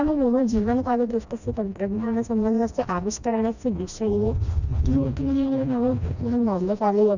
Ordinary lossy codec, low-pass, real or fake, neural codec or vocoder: none; 7.2 kHz; fake; codec, 16 kHz, 1 kbps, FreqCodec, smaller model